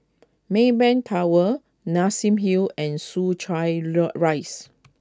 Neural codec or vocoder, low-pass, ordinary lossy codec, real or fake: none; none; none; real